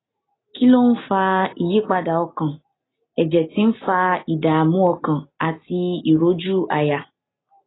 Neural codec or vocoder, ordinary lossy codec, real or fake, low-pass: none; AAC, 16 kbps; real; 7.2 kHz